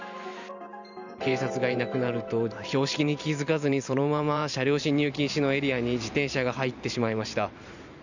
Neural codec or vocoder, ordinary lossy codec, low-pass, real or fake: vocoder, 44.1 kHz, 128 mel bands every 512 samples, BigVGAN v2; none; 7.2 kHz; fake